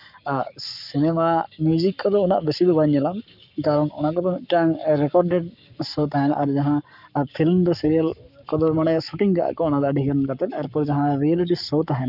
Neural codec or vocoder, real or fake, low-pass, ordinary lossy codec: codec, 44.1 kHz, 7.8 kbps, Pupu-Codec; fake; 5.4 kHz; none